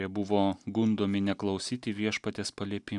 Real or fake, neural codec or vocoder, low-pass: real; none; 10.8 kHz